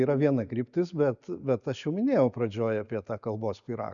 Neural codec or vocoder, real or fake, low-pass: none; real; 7.2 kHz